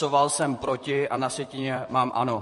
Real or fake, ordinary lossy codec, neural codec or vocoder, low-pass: fake; MP3, 48 kbps; vocoder, 44.1 kHz, 128 mel bands, Pupu-Vocoder; 14.4 kHz